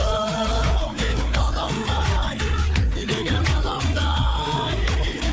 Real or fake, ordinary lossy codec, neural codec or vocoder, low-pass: fake; none; codec, 16 kHz, 4 kbps, FreqCodec, larger model; none